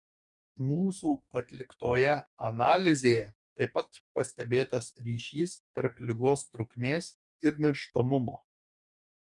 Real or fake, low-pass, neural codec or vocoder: fake; 10.8 kHz; codec, 44.1 kHz, 2.6 kbps, DAC